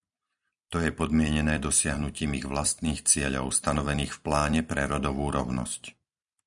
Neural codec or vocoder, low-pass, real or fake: vocoder, 44.1 kHz, 128 mel bands every 256 samples, BigVGAN v2; 10.8 kHz; fake